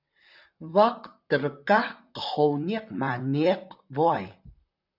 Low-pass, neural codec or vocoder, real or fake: 5.4 kHz; vocoder, 44.1 kHz, 128 mel bands, Pupu-Vocoder; fake